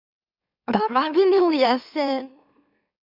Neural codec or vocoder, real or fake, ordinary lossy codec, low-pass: autoencoder, 44.1 kHz, a latent of 192 numbers a frame, MeloTTS; fake; AAC, 48 kbps; 5.4 kHz